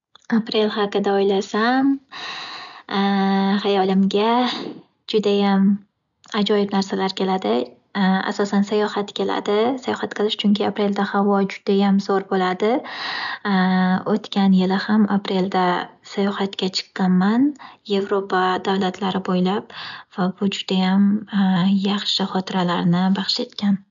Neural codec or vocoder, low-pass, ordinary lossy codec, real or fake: none; 7.2 kHz; none; real